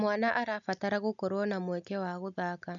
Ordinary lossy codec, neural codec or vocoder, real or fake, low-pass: none; none; real; 7.2 kHz